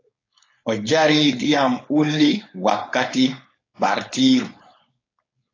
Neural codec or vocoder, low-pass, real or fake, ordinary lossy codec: codec, 16 kHz, 4.8 kbps, FACodec; 7.2 kHz; fake; AAC, 32 kbps